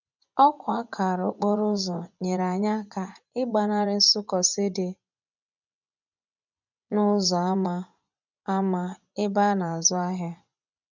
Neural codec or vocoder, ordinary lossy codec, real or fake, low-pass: vocoder, 24 kHz, 100 mel bands, Vocos; none; fake; 7.2 kHz